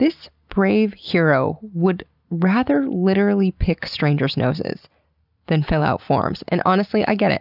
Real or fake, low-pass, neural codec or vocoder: real; 5.4 kHz; none